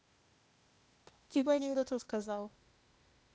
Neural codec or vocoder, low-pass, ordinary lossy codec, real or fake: codec, 16 kHz, 0.8 kbps, ZipCodec; none; none; fake